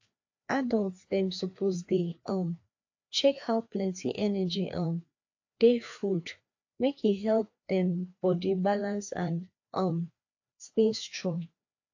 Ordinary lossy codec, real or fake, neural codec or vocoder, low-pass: AAC, 48 kbps; fake; codec, 16 kHz, 2 kbps, FreqCodec, larger model; 7.2 kHz